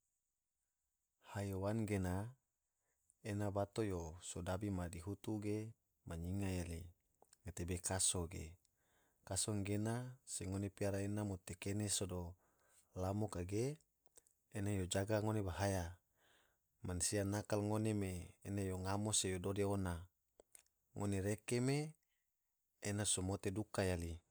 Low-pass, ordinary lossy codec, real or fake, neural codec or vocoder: none; none; real; none